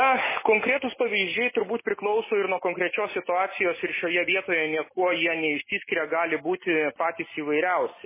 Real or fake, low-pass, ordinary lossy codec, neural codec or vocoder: real; 3.6 kHz; MP3, 16 kbps; none